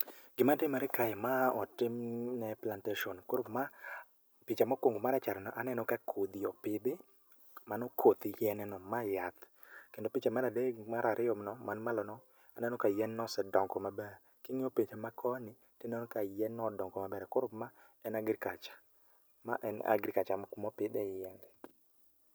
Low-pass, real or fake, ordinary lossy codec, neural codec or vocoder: none; real; none; none